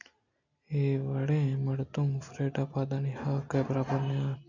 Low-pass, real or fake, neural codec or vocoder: 7.2 kHz; real; none